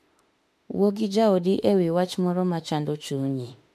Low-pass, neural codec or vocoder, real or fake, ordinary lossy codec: 14.4 kHz; autoencoder, 48 kHz, 32 numbers a frame, DAC-VAE, trained on Japanese speech; fake; MP3, 64 kbps